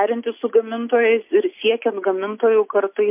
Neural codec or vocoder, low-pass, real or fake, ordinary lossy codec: none; 3.6 kHz; real; MP3, 32 kbps